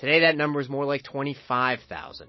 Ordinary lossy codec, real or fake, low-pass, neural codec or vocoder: MP3, 24 kbps; real; 7.2 kHz; none